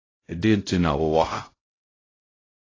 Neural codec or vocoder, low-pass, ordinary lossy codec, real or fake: codec, 16 kHz, 0.5 kbps, X-Codec, HuBERT features, trained on LibriSpeech; 7.2 kHz; AAC, 32 kbps; fake